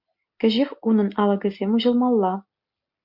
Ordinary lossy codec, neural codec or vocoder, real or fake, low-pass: MP3, 48 kbps; none; real; 5.4 kHz